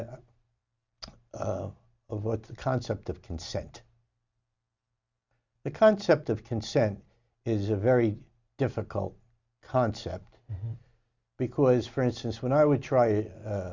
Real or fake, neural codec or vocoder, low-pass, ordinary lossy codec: real; none; 7.2 kHz; Opus, 64 kbps